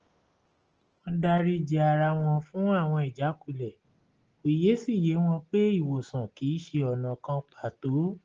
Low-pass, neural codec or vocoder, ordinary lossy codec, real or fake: 7.2 kHz; none; Opus, 16 kbps; real